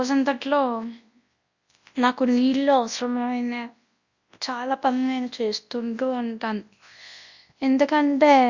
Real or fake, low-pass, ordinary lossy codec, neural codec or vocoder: fake; 7.2 kHz; Opus, 64 kbps; codec, 24 kHz, 0.9 kbps, WavTokenizer, large speech release